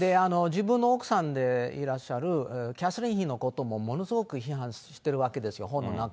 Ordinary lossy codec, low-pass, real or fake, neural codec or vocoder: none; none; real; none